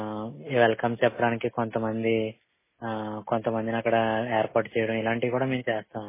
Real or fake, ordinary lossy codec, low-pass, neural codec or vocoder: real; MP3, 16 kbps; 3.6 kHz; none